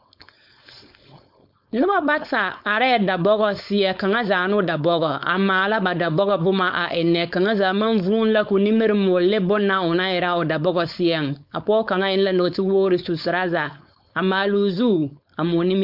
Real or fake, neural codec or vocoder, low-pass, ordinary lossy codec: fake; codec, 16 kHz, 4.8 kbps, FACodec; 5.4 kHz; MP3, 48 kbps